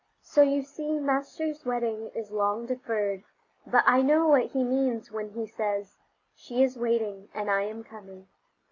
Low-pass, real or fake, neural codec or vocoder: 7.2 kHz; real; none